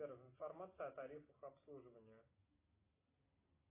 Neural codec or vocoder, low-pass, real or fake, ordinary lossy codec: none; 3.6 kHz; real; AAC, 24 kbps